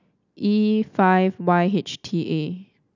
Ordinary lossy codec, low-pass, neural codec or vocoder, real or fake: none; 7.2 kHz; none; real